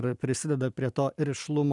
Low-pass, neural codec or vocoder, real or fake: 10.8 kHz; none; real